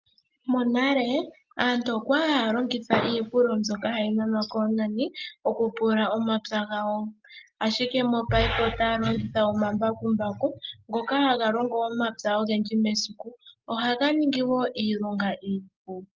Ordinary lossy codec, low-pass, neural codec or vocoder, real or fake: Opus, 24 kbps; 7.2 kHz; none; real